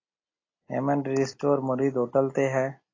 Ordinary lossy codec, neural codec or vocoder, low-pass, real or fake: AAC, 32 kbps; none; 7.2 kHz; real